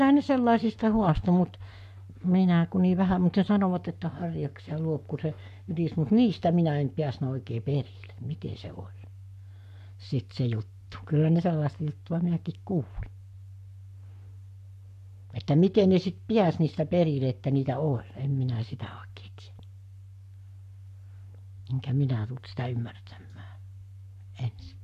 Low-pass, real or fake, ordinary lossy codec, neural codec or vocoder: 14.4 kHz; fake; none; codec, 44.1 kHz, 7.8 kbps, Pupu-Codec